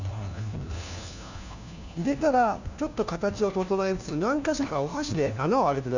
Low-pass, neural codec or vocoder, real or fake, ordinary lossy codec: 7.2 kHz; codec, 16 kHz, 1 kbps, FunCodec, trained on LibriTTS, 50 frames a second; fake; none